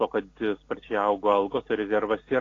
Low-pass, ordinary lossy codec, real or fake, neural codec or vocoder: 7.2 kHz; AAC, 32 kbps; real; none